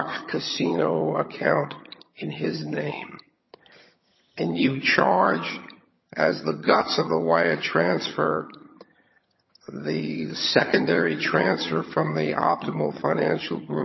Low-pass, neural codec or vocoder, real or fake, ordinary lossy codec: 7.2 kHz; vocoder, 22.05 kHz, 80 mel bands, HiFi-GAN; fake; MP3, 24 kbps